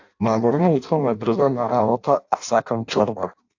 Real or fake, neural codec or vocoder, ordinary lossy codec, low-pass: fake; codec, 16 kHz in and 24 kHz out, 0.6 kbps, FireRedTTS-2 codec; AAC, 48 kbps; 7.2 kHz